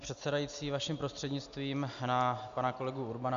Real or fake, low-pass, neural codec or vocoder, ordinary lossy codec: real; 7.2 kHz; none; Opus, 64 kbps